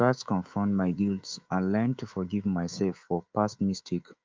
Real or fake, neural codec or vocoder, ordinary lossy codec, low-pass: fake; codec, 24 kHz, 3.1 kbps, DualCodec; Opus, 24 kbps; 7.2 kHz